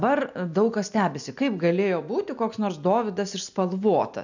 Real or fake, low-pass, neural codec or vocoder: real; 7.2 kHz; none